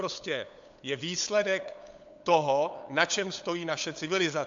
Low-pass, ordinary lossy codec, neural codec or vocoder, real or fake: 7.2 kHz; AAC, 64 kbps; codec, 16 kHz, 8 kbps, FunCodec, trained on LibriTTS, 25 frames a second; fake